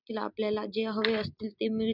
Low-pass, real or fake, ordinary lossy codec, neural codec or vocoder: 5.4 kHz; real; none; none